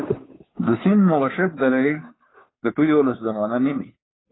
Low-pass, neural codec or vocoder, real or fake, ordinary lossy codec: 7.2 kHz; codec, 16 kHz, 2 kbps, FunCodec, trained on Chinese and English, 25 frames a second; fake; AAC, 16 kbps